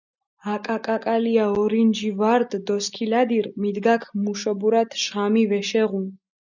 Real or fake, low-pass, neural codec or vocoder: real; 7.2 kHz; none